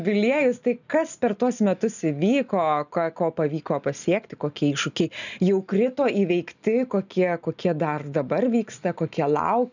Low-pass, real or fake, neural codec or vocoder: 7.2 kHz; real; none